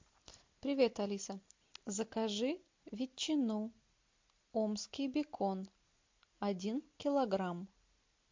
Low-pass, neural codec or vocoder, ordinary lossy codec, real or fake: 7.2 kHz; none; MP3, 48 kbps; real